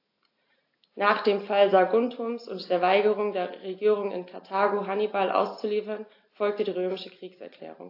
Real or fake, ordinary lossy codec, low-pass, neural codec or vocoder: real; AAC, 32 kbps; 5.4 kHz; none